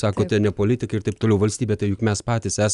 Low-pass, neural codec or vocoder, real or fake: 10.8 kHz; none; real